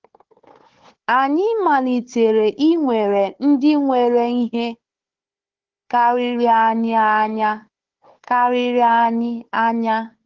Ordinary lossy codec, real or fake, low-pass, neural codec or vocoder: Opus, 16 kbps; fake; 7.2 kHz; codec, 16 kHz, 4 kbps, FunCodec, trained on Chinese and English, 50 frames a second